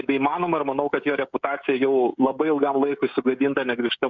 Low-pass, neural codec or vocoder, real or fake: 7.2 kHz; codec, 16 kHz, 16 kbps, FreqCodec, smaller model; fake